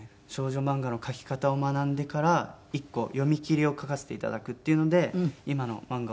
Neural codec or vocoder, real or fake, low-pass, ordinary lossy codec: none; real; none; none